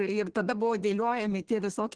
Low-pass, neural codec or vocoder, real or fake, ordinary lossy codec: 9.9 kHz; codec, 32 kHz, 1.9 kbps, SNAC; fake; Opus, 16 kbps